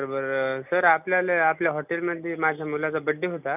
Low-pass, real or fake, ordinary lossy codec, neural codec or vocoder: 3.6 kHz; real; none; none